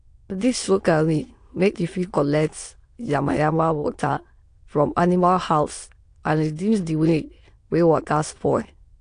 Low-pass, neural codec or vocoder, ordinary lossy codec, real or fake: 9.9 kHz; autoencoder, 22.05 kHz, a latent of 192 numbers a frame, VITS, trained on many speakers; AAC, 48 kbps; fake